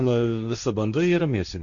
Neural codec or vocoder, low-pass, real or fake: codec, 16 kHz, 1.1 kbps, Voila-Tokenizer; 7.2 kHz; fake